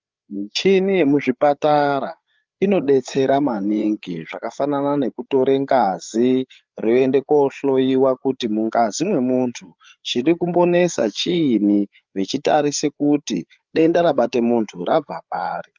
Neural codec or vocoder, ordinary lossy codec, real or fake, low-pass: codec, 16 kHz, 8 kbps, FreqCodec, larger model; Opus, 24 kbps; fake; 7.2 kHz